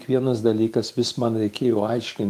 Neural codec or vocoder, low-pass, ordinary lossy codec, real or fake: autoencoder, 48 kHz, 128 numbers a frame, DAC-VAE, trained on Japanese speech; 14.4 kHz; Opus, 32 kbps; fake